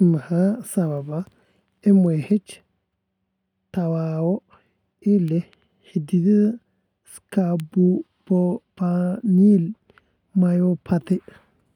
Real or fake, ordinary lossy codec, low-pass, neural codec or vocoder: real; none; 19.8 kHz; none